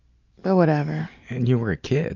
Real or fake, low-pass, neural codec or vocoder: real; 7.2 kHz; none